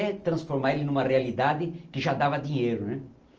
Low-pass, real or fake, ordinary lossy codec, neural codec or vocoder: 7.2 kHz; real; Opus, 24 kbps; none